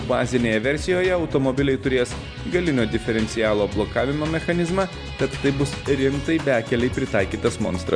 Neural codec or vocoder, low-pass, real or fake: none; 9.9 kHz; real